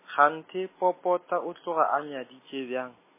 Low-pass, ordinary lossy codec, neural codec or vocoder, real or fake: 3.6 kHz; MP3, 16 kbps; none; real